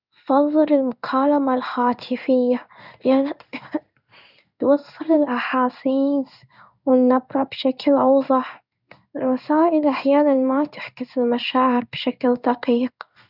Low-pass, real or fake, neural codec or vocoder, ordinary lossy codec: 5.4 kHz; fake; codec, 16 kHz in and 24 kHz out, 1 kbps, XY-Tokenizer; none